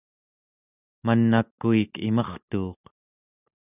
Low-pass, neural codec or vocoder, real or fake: 3.6 kHz; none; real